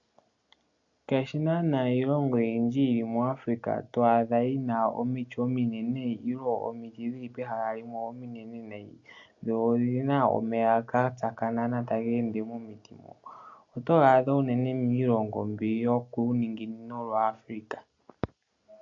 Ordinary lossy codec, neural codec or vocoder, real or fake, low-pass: AAC, 64 kbps; none; real; 7.2 kHz